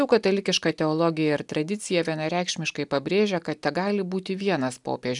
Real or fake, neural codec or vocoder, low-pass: real; none; 10.8 kHz